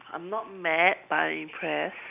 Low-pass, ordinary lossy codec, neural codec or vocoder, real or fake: 3.6 kHz; none; none; real